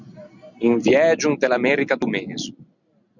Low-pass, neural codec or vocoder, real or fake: 7.2 kHz; none; real